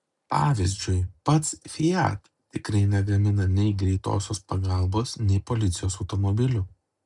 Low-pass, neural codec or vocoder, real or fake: 10.8 kHz; none; real